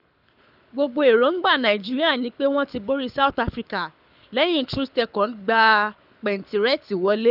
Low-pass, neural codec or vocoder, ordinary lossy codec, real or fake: 5.4 kHz; codec, 24 kHz, 6 kbps, HILCodec; none; fake